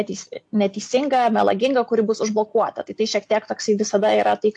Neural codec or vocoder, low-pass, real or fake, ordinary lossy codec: none; 10.8 kHz; real; AAC, 64 kbps